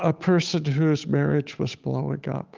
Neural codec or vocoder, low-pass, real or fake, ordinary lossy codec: codec, 16 kHz, 8 kbps, FunCodec, trained on Chinese and English, 25 frames a second; 7.2 kHz; fake; Opus, 32 kbps